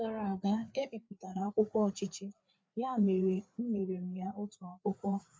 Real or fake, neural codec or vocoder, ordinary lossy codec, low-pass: fake; codec, 16 kHz, 4 kbps, FreqCodec, larger model; none; none